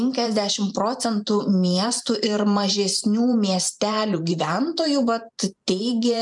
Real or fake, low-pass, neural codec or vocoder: fake; 10.8 kHz; vocoder, 44.1 kHz, 128 mel bands every 512 samples, BigVGAN v2